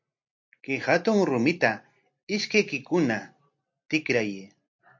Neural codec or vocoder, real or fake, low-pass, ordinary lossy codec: none; real; 7.2 kHz; MP3, 48 kbps